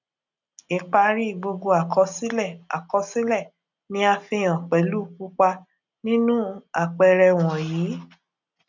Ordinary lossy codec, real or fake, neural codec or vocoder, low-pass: none; real; none; 7.2 kHz